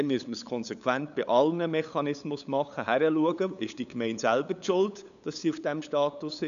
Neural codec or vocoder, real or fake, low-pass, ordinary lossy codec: codec, 16 kHz, 8 kbps, FunCodec, trained on LibriTTS, 25 frames a second; fake; 7.2 kHz; none